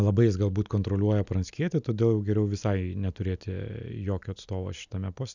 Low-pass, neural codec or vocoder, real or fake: 7.2 kHz; none; real